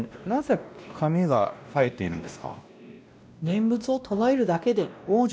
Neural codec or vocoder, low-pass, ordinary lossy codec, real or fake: codec, 16 kHz, 1 kbps, X-Codec, WavLM features, trained on Multilingual LibriSpeech; none; none; fake